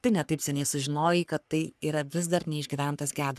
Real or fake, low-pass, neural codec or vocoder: fake; 14.4 kHz; codec, 44.1 kHz, 3.4 kbps, Pupu-Codec